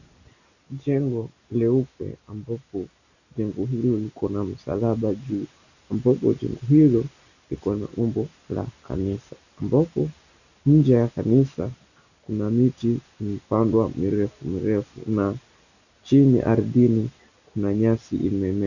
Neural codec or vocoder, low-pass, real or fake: none; 7.2 kHz; real